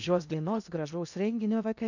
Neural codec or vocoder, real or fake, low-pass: codec, 16 kHz in and 24 kHz out, 0.6 kbps, FocalCodec, streaming, 2048 codes; fake; 7.2 kHz